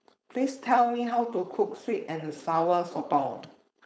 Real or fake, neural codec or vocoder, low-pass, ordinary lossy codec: fake; codec, 16 kHz, 4.8 kbps, FACodec; none; none